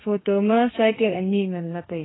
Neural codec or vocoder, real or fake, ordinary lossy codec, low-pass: codec, 32 kHz, 1.9 kbps, SNAC; fake; AAC, 16 kbps; 7.2 kHz